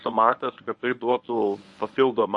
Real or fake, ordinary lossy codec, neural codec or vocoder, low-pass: fake; MP3, 48 kbps; codec, 24 kHz, 0.9 kbps, WavTokenizer, medium speech release version 1; 10.8 kHz